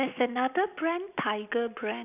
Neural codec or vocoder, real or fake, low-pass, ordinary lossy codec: none; real; 3.6 kHz; none